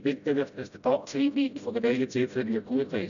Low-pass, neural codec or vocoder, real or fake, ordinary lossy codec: 7.2 kHz; codec, 16 kHz, 0.5 kbps, FreqCodec, smaller model; fake; none